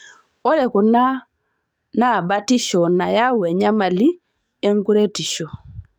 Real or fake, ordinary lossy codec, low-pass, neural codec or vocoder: fake; none; none; codec, 44.1 kHz, 7.8 kbps, DAC